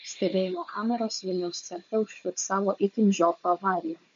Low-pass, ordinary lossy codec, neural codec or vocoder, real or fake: 7.2 kHz; MP3, 48 kbps; codec, 16 kHz, 4 kbps, FunCodec, trained on Chinese and English, 50 frames a second; fake